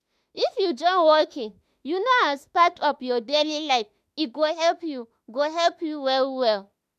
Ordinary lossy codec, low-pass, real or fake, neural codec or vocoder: MP3, 96 kbps; 14.4 kHz; fake; autoencoder, 48 kHz, 32 numbers a frame, DAC-VAE, trained on Japanese speech